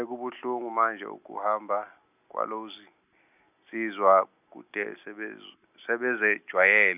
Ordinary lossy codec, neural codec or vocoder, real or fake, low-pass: none; none; real; 3.6 kHz